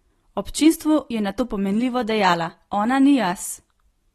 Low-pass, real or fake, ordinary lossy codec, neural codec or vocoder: 19.8 kHz; real; AAC, 32 kbps; none